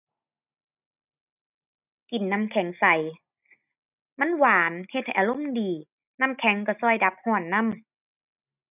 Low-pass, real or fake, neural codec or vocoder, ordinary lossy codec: 3.6 kHz; real; none; none